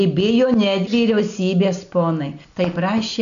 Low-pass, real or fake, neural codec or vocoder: 7.2 kHz; real; none